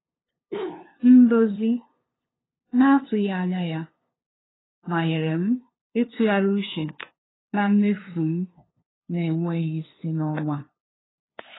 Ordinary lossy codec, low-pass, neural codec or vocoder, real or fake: AAC, 16 kbps; 7.2 kHz; codec, 16 kHz, 2 kbps, FunCodec, trained on LibriTTS, 25 frames a second; fake